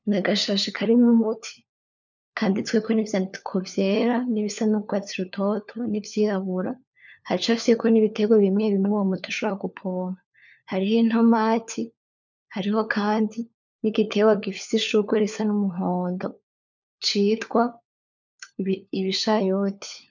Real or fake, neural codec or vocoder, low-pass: fake; codec, 16 kHz, 4 kbps, FunCodec, trained on LibriTTS, 50 frames a second; 7.2 kHz